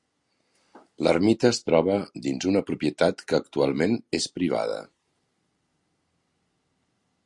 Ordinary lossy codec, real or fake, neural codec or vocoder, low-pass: Opus, 64 kbps; real; none; 10.8 kHz